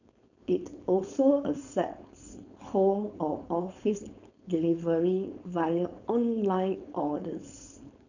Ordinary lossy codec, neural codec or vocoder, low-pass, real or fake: none; codec, 16 kHz, 4.8 kbps, FACodec; 7.2 kHz; fake